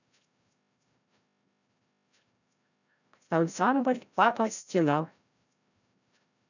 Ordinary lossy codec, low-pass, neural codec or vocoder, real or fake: none; 7.2 kHz; codec, 16 kHz, 0.5 kbps, FreqCodec, larger model; fake